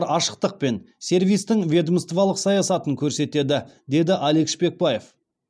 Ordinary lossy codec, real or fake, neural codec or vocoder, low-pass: none; real; none; none